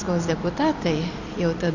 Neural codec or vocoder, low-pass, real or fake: none; 7.2 kHz; real